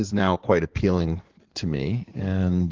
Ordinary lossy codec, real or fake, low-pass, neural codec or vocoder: Opus, 16 kbps; fake; 7.2 kHz; codec, 16 kHz in and 24 kHz out, 2.2 kbps, FireRedTTS-2 codec